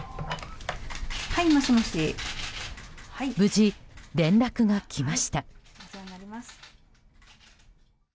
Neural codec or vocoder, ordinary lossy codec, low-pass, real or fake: none; none; none; real